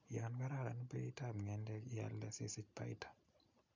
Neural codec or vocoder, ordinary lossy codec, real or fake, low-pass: none; none; real; 7.2 kHz